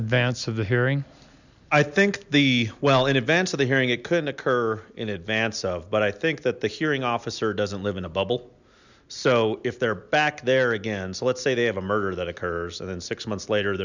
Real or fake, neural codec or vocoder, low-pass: real; none; 7.2 kHz